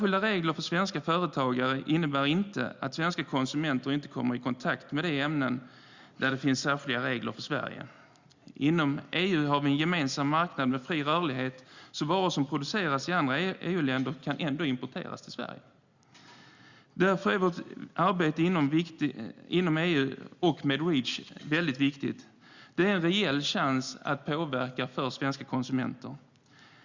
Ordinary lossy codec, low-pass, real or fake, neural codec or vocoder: Opus, 64 kbps; 7.2 kHz; real; none